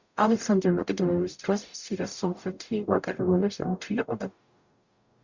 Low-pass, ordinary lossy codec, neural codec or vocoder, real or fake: 7.2 kHz; Opus, 64 kbps; codec, 44.1 kHz, 0.9 kbps, DAC; fake